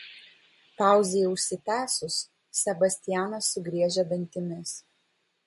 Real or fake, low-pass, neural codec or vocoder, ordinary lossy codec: real; 14.4 kHz; none; MP3, 48 kbps